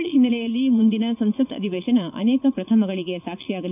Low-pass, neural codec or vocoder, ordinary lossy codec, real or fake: 3.6 kHz; vocoder, 44.1 kHz, 80 mel bands, Vocos; none; fake